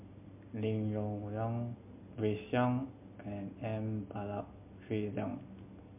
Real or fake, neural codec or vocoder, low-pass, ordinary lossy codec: real; none; 3.6 kHz; MP3, 32 kbps